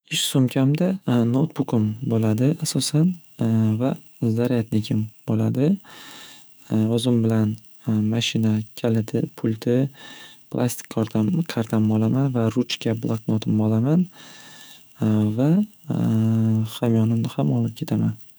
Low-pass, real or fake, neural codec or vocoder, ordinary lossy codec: none; fake; autoencoder, 48 kHz, 128 numbers a frame, DAC-VAE, trained on Japanese speech; none